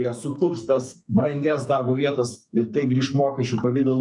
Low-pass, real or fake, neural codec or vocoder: 10.8 kHz; fake; codec, 32 kHz, 1.9 kbps, SNAC